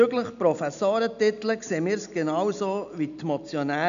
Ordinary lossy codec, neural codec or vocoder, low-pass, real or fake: none; none; 7.2 kHz; real